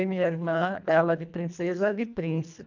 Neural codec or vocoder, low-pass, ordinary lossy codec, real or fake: codec, 24 kHz, 1.5 kbps, HILCodec; 7.2 kHz; none; fake